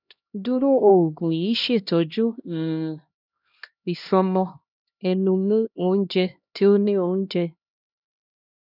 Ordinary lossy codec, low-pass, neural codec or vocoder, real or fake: none; 5.4 kHz; codec, 16 kHz, 1 kbps, X-Codec, HuBERT features, trained on LibriSpeech; fake